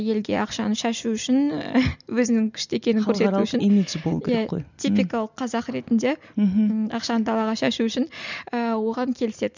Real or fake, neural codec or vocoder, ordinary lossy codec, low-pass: real; none; none; 7.2 kHz